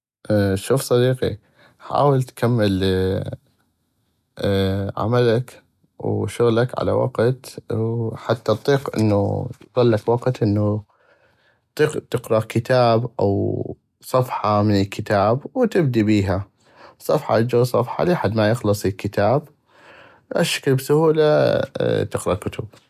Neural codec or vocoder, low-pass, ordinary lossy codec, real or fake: none; 14.4 kHz; none; real